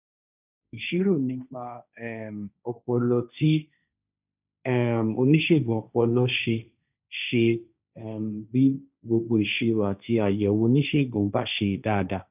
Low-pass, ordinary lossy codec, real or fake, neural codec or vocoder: 3.6 kHz; none; fake; codec, 16 kHz, 1.1 kbps, Voila-Tokenizer